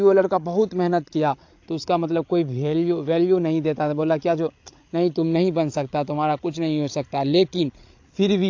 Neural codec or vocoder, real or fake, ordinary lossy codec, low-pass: codec, 16 kHz, 16 kbps, FreqCodec, larger model; fake; AAC, 48 kbps; 7.2 kHz